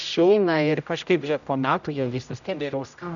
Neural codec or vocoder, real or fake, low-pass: codec, 16 kHz, 0.5 kbps, X-Codec, HuBERT features, trained on general audio; fake; 7.2 kHz